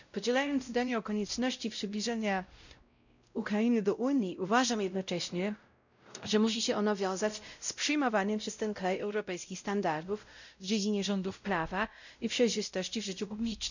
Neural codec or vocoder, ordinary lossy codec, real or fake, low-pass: codec, 16 kHz, 0.5 kbps, X-Codec, WavLM features, trained on Multilingual LibriSpeech; none; fake; 7.2 kHz